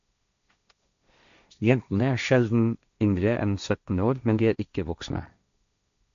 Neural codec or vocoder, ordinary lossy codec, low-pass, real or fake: codec, 16 kHz, 1.1 kbps, Voila-Tokenizer; AAC, 96 kbps; 7.2 kHz; fake